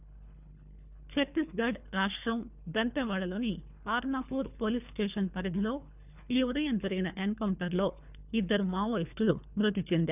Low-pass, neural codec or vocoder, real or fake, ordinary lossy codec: 3.6 kHz; codec, 24 kHz, 3 kbps, HILCodec; fake; none